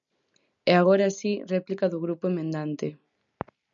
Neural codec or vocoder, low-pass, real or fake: none; 7.2 kHz; real